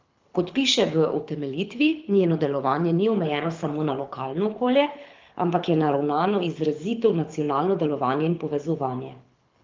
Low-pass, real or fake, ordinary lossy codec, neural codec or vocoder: 7.2 kHz; fake; Opus, 32 kbps; codec, 24 kHz, 6 kbps, HILCodec